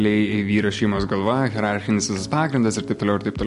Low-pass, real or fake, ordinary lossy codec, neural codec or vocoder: 14.4 kHz; real; MP3, 48 kbps; none